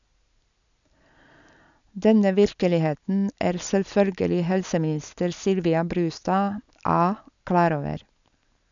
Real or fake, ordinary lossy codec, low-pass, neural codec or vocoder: real; none; 7.2 kHz; none